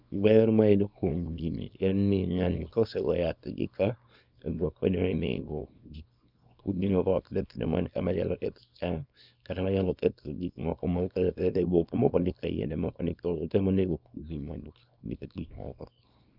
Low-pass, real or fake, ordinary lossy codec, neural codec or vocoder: 5.4 kHz; fake; none; codec, 24 kHz, 0.9 kbps, WavTokenizer, small release